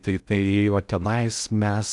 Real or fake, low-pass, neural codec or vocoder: fake; 10.8 kHz; codec, 16 kHz in and 24 kHz out, 0.6 kbps, FocalCodec, streaming, 4096 codes